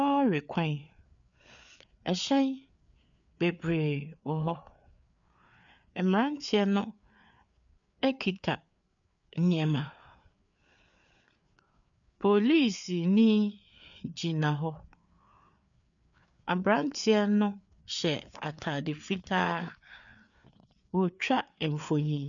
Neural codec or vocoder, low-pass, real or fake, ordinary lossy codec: codec, 16 kHz, 4 kbps, FreqCodec, larger model; 7.2 kHz; fake; MP3, 96 kbps